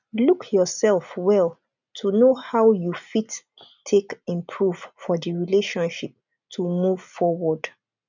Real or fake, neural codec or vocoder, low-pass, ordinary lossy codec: real; none; 7.2 kHz; none